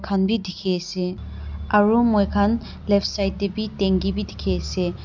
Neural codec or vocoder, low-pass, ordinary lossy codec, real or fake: none; 7.2 kHz; none; real